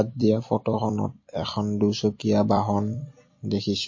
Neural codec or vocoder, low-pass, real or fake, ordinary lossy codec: vocoder, 44.1 kHz, 128 mel bands every 256 samples, BigVGAN v2; 7.2 kHz; fake; MP3, 32 kbps